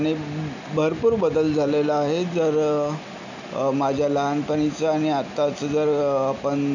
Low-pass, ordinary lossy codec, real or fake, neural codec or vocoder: 7.2 kHz; none; real; none